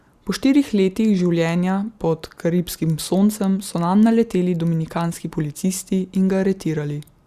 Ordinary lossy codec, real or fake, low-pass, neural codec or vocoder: Opus, 64 kbps; real; 14.4 kHz; none